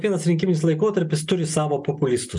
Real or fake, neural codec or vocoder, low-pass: real; none; 10.8 kHz